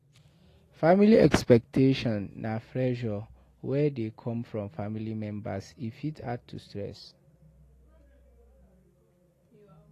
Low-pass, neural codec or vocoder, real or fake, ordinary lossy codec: 14.4 kHz; none; real; AAC, 48 kbps